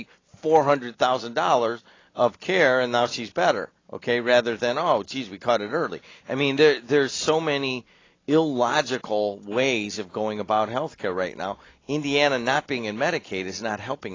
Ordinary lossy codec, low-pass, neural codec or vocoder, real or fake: AAC, 32 kbps; 7.2 kHz; none; real